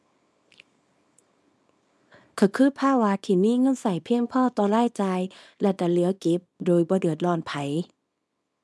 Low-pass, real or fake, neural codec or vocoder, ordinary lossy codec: none; fake; codec, 24 kHz, 0.9 kbps, WavTokenizer, small release; none